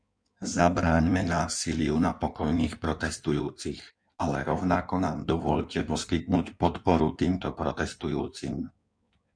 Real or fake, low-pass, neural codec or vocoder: fake; 9.9 kHz; codec, 16 kHz in and 24 kHz out, 1.1 kbps, FireRedTTS-2 codec